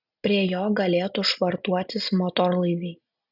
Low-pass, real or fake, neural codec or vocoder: 5.4 kHz; real; none